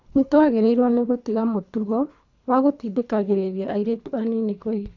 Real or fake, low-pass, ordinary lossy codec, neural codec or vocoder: fake; 7.2 kHz; none; codec, 24 kHz, 3 kbps, HILCodec